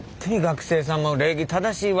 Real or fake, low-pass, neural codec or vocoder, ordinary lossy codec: real; none; none; none